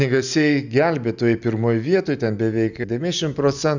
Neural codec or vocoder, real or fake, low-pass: none; real; 7.2 kHz